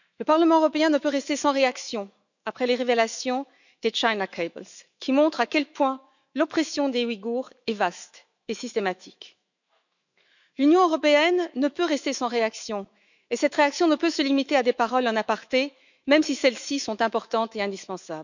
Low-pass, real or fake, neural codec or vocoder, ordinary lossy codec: 7.2 kHz; fake; autoencoder, 48 kHz, 128 numbers a frame, DAC-VAE, trained on Japanese speech; none